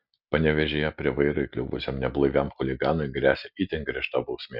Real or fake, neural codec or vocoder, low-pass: real; none; 5.4 kHz